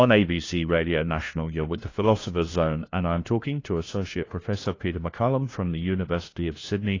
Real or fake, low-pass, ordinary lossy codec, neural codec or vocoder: fake; 7.2 kHz; AAC, 32 kbps; codec, 16 kHz, 1 kbps, FunCodec, trained on LibriTTS, 50 frames a second